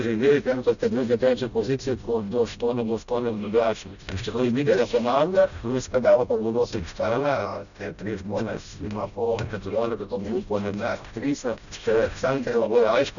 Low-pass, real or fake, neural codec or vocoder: 7.2 kHz; fake; codec, 16 kHz, 0.5 kbps, FreqCodec, smaller model